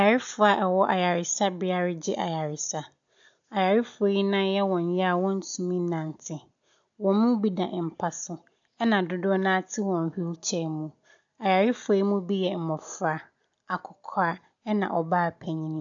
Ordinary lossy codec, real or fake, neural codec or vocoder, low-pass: AAC, 64 kbps; real; none; 7.2 kHz